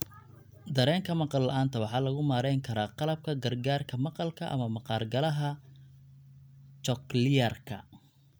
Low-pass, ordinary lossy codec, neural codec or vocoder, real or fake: none; none; none; real